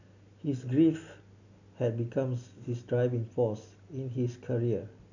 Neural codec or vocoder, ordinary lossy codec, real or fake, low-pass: none; none; real; 7.2 kHz